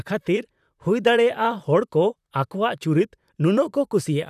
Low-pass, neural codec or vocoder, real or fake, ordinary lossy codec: 14.4 kHz; vocoder, 44.1 kHz, 128 mel bands, Pupu-Vocoder; fake; none